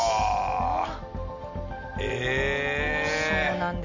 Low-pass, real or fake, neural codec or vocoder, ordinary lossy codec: 7.2 kHz; real; none; none